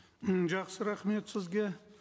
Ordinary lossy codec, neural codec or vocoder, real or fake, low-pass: none; none; real; none